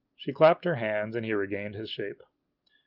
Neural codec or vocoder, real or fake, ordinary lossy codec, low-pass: none; real; Opus, 32 kbps; 5.4 kHz